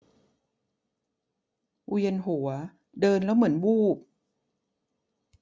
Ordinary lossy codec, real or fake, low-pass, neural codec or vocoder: none; real; none; none